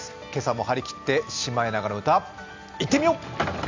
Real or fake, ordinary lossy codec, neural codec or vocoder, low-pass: real; MP3, 64 kbps; none; 7.2 kHz